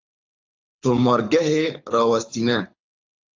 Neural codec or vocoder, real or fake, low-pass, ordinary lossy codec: codec, 24 kHz, 6 kbps, HILCodec; fake; 7.2 kHz; AAC, 48 kbps